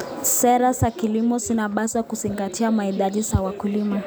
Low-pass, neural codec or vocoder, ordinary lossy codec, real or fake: none; none; none; real